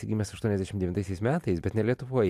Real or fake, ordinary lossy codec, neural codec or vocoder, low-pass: real; MP3, 96 kbps; none; 14.4 kHz